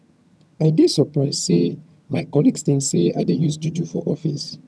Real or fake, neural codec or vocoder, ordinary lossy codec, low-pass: fake; vocoder, 22.05 kHz, 80 mel bands, HiFi-GAN; none; none